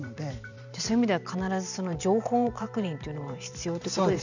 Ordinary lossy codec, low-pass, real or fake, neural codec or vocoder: none; 7.2 kHz; fake; vocoder, 44.1 kHz, 128 mel bands every 512 samples, BigVGAN v2